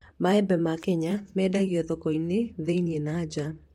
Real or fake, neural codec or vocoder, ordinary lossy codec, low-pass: fake; vocoder, 44.1 kHz, 128 mel bands, Pupu-Vocoder; MP3, 64 kbps; 19.8 kHz